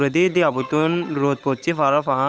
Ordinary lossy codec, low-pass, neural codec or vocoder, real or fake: none; none; codec, 16 kHz, 8 kbps, FunCodec, trained on Chinese and English, 25 frames a second; fake